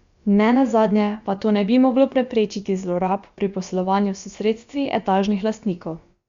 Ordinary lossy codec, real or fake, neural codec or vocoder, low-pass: Opus, 64 kbps; fake; codec, 16 kHz, about 1 kbps, DyCAST, with the encoder's durations; 7.2 kHz